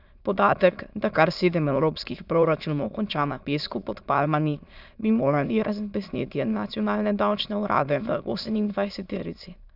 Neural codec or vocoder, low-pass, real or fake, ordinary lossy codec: autoencoder, 22.05 kHz, a latent of 192 numbers a frame, VITS, trained on many speakers; 5.4 kHz; fake; none